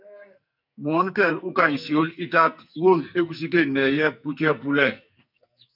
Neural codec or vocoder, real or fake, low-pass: codec, 44.1 kHz, 2.6 kbps, SNAC; fake; 5.4 kHz